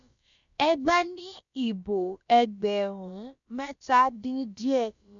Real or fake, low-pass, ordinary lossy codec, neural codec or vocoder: fake; 7.2 kHz; none; codec, 16 kHz, about 1 kbps, DyCAST, with the encoder's durations